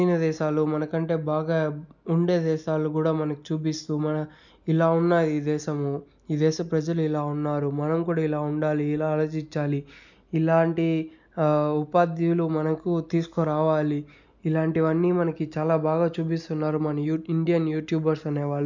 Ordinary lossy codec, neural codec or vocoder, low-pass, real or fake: none; none; 7.2 kHz; real